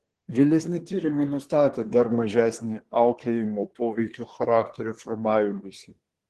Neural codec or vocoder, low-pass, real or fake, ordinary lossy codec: codec, 24 kHz, 1 kbps, SNAC; 10.8 kHz; fake; Opus, 16 kbps